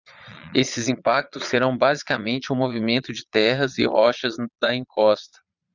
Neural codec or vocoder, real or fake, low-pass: vocoder, 22.05 kHz, 80 mel bands, Vocos; fake; 7.2 kHz